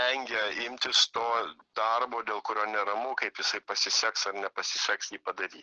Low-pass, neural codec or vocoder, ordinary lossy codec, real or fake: 7.2 kHz; none; Opus, 16 kbps; real